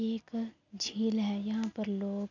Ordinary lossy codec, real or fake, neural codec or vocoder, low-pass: none; real; none; 7.2 kHz